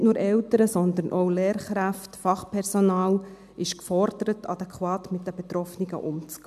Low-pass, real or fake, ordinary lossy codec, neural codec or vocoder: 14.4 kHz; real; MP3, 96 kbps; none